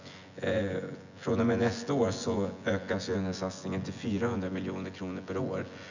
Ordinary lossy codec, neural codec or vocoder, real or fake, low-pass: none; vocoder, 24 kHz, 100 mel bands, Vocos; fake; 7.2 kHz